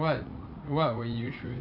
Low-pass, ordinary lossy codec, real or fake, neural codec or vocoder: 5.4 kHz; none; fake; vocoder, 44.1 kHz, 80 mel bands, Vocos